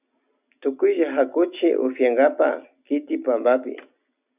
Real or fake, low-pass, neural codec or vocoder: real; 3.6 kHz; none